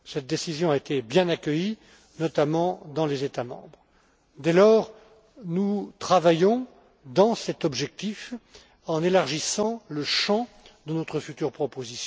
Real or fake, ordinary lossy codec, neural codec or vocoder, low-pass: real; none; none; none